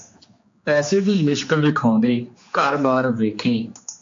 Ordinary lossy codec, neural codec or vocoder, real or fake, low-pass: MP3, 48 kbps; codec, 16 kHz, 2 kbps, X-Codec, HuBERT features, trained on general audio; fake; 7.2 kHz